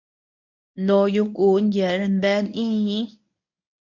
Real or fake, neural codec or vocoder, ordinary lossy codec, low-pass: fake; codec, 24 kHz, 0.9 kbps, WavTokenizer, medium speech release version 1; MP3, 48 kbps; 7.2 kHz